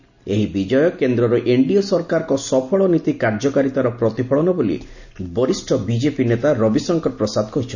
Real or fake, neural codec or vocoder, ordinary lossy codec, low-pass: real; none; none; 7.2 kHz